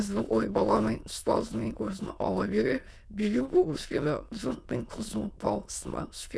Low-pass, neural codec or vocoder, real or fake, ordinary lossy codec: none; autoencoder, 22.05 kHz, a latent of 192 numbers a frame, VITS, trained on many speakers; fake; none